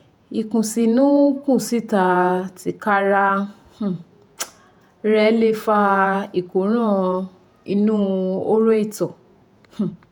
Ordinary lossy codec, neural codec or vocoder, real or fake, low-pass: none; vocoder, 48 kHz, 128 mel bands, Vocos; fake; 19.8 kHz